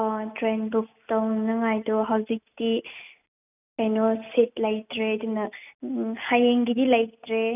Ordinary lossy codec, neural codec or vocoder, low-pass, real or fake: none; none; 3.6 kHz; real